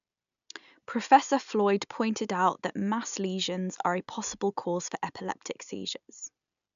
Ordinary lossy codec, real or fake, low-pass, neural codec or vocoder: none; real; 7.2 kHz; none